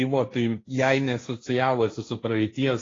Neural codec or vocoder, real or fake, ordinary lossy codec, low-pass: codec, 16 kHz, 1.1 kbps, Voila-Tokenizer; fake; AAC, 32 kbps; 7.2 kHz